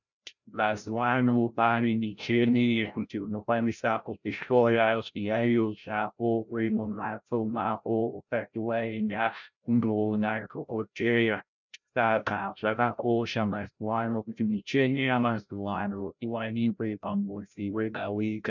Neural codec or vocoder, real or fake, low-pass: codec, 16 kHz, 0.5 kbps, FreqCodec, larger model; fake; 7.2 kHz